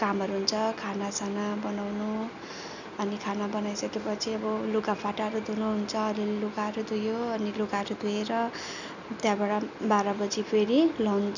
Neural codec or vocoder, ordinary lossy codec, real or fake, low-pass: none; none; real; 7.2 kHz